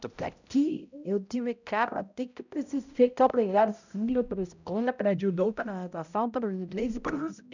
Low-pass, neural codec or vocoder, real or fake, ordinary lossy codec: 7.2 kHz; codec, 16 kHz, 0.5 kbps, X-Codec, HuBERT features, trained on balanced general audio; fake; none